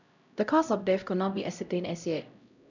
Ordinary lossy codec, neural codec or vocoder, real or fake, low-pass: none; codec, 16 kHz, 0.5 kbps, X-Codec, HuBERT features, trained on LibriSpeech; fake; 7.2 kHz